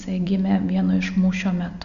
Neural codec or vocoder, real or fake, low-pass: none; real; 7.2 kHz